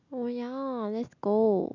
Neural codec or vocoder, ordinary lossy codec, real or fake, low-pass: none; none; real; 7.2 kHz